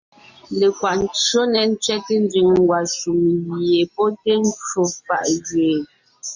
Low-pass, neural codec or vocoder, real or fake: 7.2 kHz; none; real